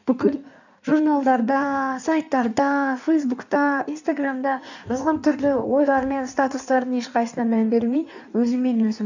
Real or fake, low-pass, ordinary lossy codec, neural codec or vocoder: fake; 7.2 kHz; none; codec, 16 kHz in and 24 kHz out, 1.1 kbps, FireRedTTS-2 codec